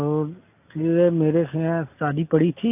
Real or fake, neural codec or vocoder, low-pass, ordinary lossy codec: real; none; 3.6 kHz; AAC, 24 kbps